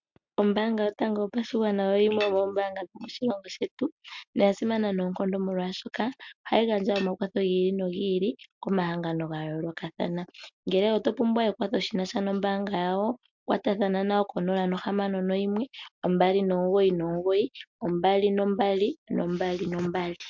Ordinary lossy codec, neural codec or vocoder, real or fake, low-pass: MP3, 64 kbps; none; real; 7.2 kHz